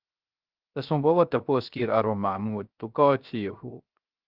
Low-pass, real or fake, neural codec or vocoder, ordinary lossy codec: 5.4 kHz; fake; codec, 16 kHz, 0.3 kbps, FocalCodec; Opus, 32 kbps